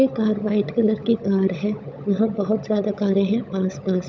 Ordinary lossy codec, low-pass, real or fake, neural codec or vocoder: none; none; fake; codec, 16 kHz, 16 kbps, FunCodec, trained on LibriTTS, 50 frames a second